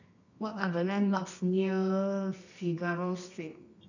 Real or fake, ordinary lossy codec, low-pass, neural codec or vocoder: fake; none; 7.2 kHz; codec, 24 kHz, 0.9 kbps, WavTokenizer, medium music audio release